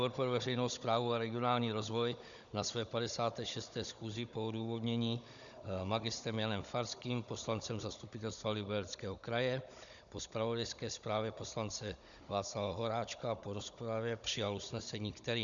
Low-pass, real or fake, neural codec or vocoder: 7.2 kHz; fake; codec, 16 kHz, 16 kbps, FunCodec, trained on Chinese and English, 50 frames a second